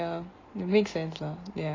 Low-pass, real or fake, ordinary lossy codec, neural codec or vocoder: 7.2 kHz; real; AAC, 32 kbps; none